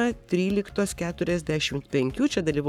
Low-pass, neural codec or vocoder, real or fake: 19.8 kHz; codec, 44.1 kHz, 7.8 kbps, Pupu-Codec; fake